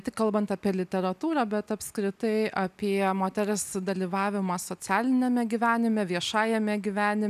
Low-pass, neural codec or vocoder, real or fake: 14.4 kHz; none; real